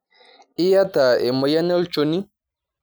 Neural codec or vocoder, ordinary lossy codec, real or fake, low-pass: none; none; real; none